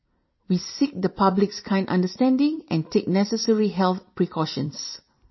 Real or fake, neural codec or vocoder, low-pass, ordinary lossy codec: fake; vocoder, 44.1 kHz, 128 mel bands every 256 samples, BigVGAN v2; 7.2 kHz; MP3, 24 kbps